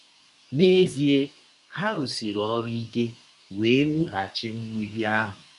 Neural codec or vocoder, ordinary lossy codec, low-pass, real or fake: codec, 24 kHz, 1 kbps, SNAC; AAC, 96 kbps; 10.8 kHz; fake